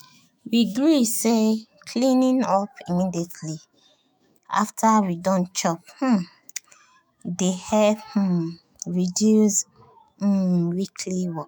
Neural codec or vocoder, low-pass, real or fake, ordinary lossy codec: autoencoder, 48 kHz, 128 numbers a frame, DAC-VAE, trained on Japanese speech; none; fake; none